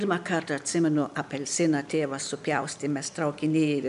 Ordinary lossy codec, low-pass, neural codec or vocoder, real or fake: AAC, 96 kbps; 10.8 kHz; none; real